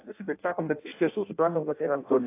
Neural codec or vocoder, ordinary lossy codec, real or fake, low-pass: codec, 16 kHz in and 24 kHz out, 0.6 kbps, FireRedTTS-2 codec; AAC, 24 kbps; fake; 3.6 kHz